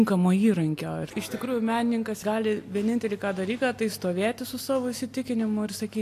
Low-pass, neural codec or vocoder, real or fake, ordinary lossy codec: 14.4 kHz; none; real; AAC, 64 kbps